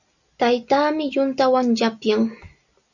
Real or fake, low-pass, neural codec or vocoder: real; 7.2 kHz; none